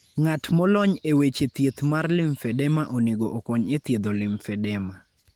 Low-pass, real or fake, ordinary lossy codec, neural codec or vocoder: 19.8 kHz; fake; Opus, 24 kbps; autoencoder, 48 kHz, 128 numbers a frame, DAC-VAE, trained on Japanese speech